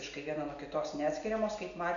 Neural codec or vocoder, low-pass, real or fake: none; 7.2 kHz; real